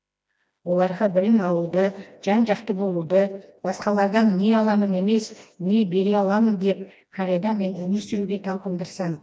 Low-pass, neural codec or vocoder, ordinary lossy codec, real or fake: none; codec, 16 kHz, 1 kbps, FreqCodec, smaller model; none; fake